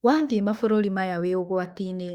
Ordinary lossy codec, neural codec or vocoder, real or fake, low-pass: Opus, 64 kbps; autoencoder, 48 kHz, 32 numbers a frame, DAC-VAE, trained on Japanese speech; fake; 19.8 kHz